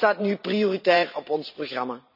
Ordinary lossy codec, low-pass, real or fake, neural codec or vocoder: none; 5.4 kHz; fake; vocoder, 44.1 kHz, 128 mel bands every 512 samples, BigVGAN v2